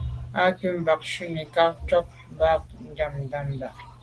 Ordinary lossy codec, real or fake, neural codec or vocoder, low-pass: Opus, 16 kbps; real; none; 10.8 kHz